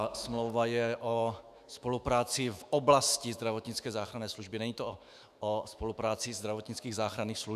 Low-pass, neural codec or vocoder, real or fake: 14.4 kHz; autoencoder, 48 kHz, 128 numbers a frame, DAC-VAE, trained on Japanese speech; fake